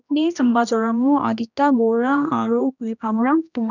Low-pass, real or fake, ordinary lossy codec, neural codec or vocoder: 7.2 kHz; fake; none; codec, 16 kHz, 1 kbps, X-Codec, HuBERT features, trained on general audio